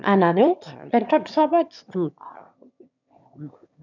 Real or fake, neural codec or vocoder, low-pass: fake; autoencoder, 22.05 kHz, a latent of 192 numbers a frame, VITS, trained on one speaker; 7.2 kHz